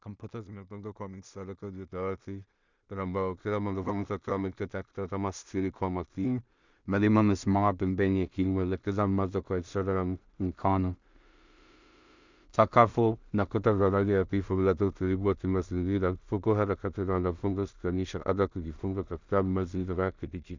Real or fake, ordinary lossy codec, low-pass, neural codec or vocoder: fake; Opus, 64 kbps; 7.2 kHz; codec, 16 kHz in and 24 kHz out, 0.4 kbps, LongCat-Audio-Codec, two codebook decoder